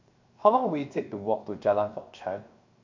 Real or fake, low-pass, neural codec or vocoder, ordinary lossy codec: fake; 7.2 kHz; codec, 16 kHz, 0.7 kbps, FocalCodec; MP3, 48 kbps